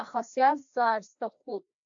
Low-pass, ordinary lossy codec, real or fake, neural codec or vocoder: 7.2 kHz; AAC, 64 kbps; fake; codec, 16 kHz, 1 kbps, FreqCodec, larger model